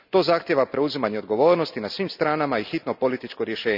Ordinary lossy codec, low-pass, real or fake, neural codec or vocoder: none; 5.4 kHz; real; none